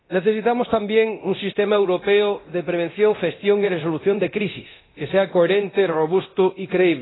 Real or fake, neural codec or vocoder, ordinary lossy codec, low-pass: fake; codec, 24 kHz, 0.9 kbps, DualCodec; AAC, 16 kbps; 7.2 kHz